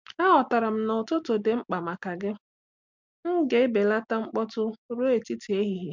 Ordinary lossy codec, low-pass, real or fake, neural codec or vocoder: MP3, 64 kbps; 7.2 kHz; real; none